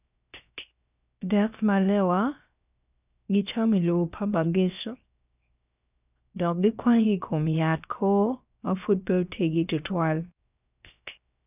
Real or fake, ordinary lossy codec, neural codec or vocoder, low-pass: fake; none; codec, 16 kHz, 0.7 kbps, FocalCodec; 3.6 kHz